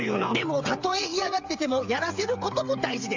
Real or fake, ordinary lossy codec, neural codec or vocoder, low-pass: fake; AAC, 48 kbps; codec, 16 kHz, 8 kbps, FreqCodec, smaller model; 7.2 kHz